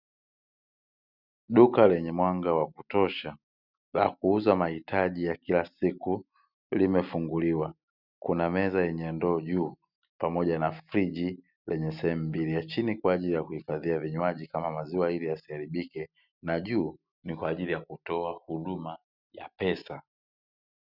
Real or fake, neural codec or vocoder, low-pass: real; none; 5.4 kHz